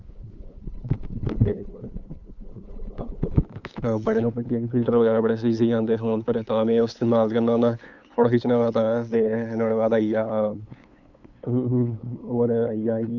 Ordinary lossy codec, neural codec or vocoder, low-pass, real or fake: none; codec, 16 kHz, 8 kbps, FunCodec, trained on LibriTTS, 25 frames a second; 7.2 kHz; fake